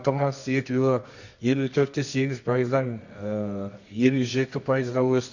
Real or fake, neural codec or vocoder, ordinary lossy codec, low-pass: fake; codec, 24 kHz, 0.9 kbps, WavTokenizer, medium music audio release; none; 7.2 kHz